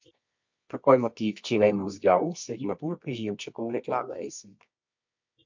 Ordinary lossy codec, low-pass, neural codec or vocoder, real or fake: MP3, 48 kbps; 7.2 kHz; codec, 24 kHz, 0.9 kbps, WavTokenizer, medium music audio release; fake